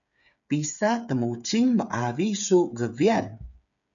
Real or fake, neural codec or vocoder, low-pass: fake; codec, 16 kHz, 8 kbps, FreqCodec, smaller model; 7.2 kHz